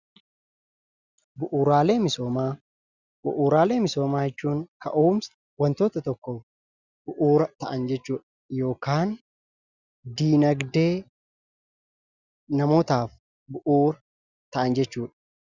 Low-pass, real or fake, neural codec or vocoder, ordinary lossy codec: 7.2 kHz; real; none; Opus, 64 kbps